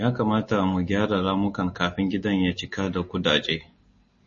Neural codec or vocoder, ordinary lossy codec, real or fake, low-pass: none; MP3, 32 kbps; real; 7.2 kHz